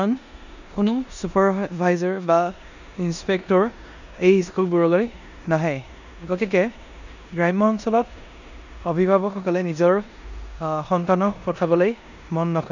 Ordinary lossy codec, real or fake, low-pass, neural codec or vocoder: none; fake; 7.2 kHz; codec, 16 kHz in and 24 kHz out, 0.9 kbps, LongCat-Audio-Codec, four codebook decoder